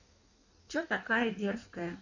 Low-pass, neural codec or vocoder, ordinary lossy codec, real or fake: 7.2 kHz; codec, 16 kHz in and 24 kHz out, 1.1 kbps, FireRedTTS-2 codec; none; fake